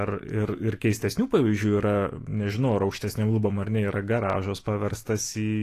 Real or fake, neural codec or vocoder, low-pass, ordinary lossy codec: fake; autoencoder, 48 kHz, 128 numbers a frame, DAC-VAE, trained on Japanese speech; 14.4 kHz; AAC, 48 kbps